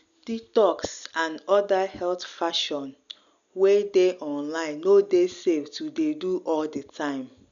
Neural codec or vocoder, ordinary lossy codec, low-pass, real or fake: none; none; 7.2 kHz; real